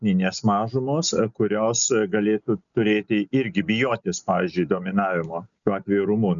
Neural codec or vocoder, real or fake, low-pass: none; real; 7.2 kHz